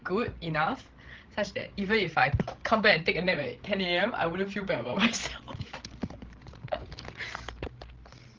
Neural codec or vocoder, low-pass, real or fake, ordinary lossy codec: codec, 16 kHz, 16 kbps, FreqCodec, larger model; 7.2 kHz; fake; Opus, 16 kbps